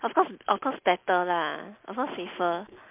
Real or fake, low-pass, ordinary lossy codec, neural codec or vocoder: real; 3.6 kHz; MP3, 32 kbps; none